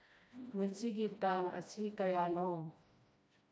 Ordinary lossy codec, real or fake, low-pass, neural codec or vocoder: none; fake; none; codec, 16 kHz, 1 kbps, FreqCodec, smaller model